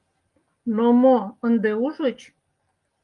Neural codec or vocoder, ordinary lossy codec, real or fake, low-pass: none; Opus, 32 kbps; real; 10.8 kHz